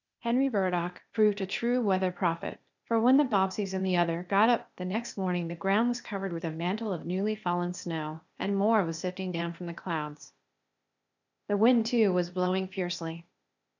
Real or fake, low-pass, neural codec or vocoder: fake; 7.2 kHz; codec, 16 kHz, 0.8 kbps, ZipCodec